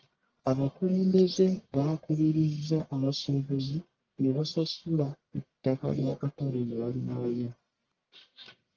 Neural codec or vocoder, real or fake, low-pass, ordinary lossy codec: codec, 44.1 kHz, 1.7 kbps, Pupu-Codec; fake; 7.2 kHz; Opus, 24 kbps